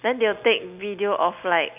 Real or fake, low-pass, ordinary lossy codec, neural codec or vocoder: real; 3.6 kHz; none; none